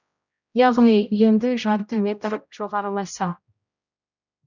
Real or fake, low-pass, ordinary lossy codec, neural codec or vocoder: fake; 7.2 kHz; none; codec, 16 kHz, 0.5 kbps, X-Codec, HuBERT features, trained on general audio